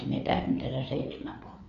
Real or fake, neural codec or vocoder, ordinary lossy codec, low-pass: fake; codec, 16 kHz, 4 kbps, FreqCodec, larger model; none; 7.2 kHz